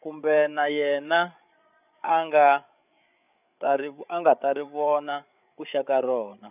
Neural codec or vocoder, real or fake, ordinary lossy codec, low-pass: codec, 16 kHz, 8 kbps, FreqCodec, larger model; fake; none; 3.6 kHz